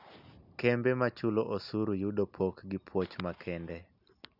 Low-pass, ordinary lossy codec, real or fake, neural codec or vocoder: 5.4 kHz; none; real; none